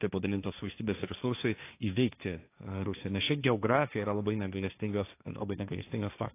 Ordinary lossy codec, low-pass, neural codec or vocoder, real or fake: AAC, 24 kbps; 3.6 kHz; codec, 16 kHz, 1.1 kbps, Voila-Tokenizer; fake